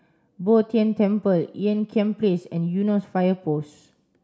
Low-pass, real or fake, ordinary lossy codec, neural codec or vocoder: none; real; none; none